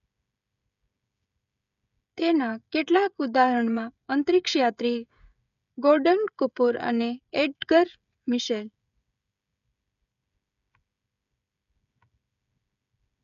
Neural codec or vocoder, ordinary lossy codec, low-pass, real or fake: codec, 16 kHz, 16 kbps, FreqCodec, smaller model; none; 7.2 kHz; fake